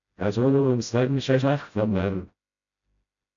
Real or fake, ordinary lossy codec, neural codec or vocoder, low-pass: fake; AAC, 64 kbps; codec, 16 kHz, 0.5 kbps, FreqCodec, smaller model; 7.2 kHz